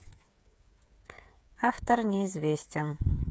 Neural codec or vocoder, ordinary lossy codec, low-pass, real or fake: codec, 16 kHz, 8 kbps, FreqCodec, smaller model; none; none; fake